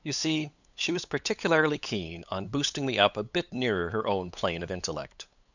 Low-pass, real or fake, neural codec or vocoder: 7.2 kHz; fake; codec, 16 kHz, 8 kbps, FunCodec, trained on LibriTTS, 25 frames a second